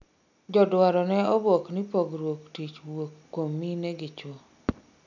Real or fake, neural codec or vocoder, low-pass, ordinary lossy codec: real; none; 7.2 kHz; none